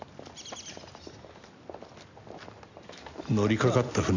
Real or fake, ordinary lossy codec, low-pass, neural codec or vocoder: real; none; 7.2 kHz; none